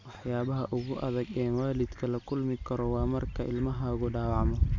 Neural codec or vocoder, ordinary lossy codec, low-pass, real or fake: none; MP3, 48 kbps; 7.2 kHz; real